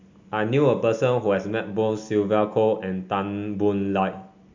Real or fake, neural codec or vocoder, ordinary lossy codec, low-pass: real; none; MP3, 64 kbps; 7.2 kHz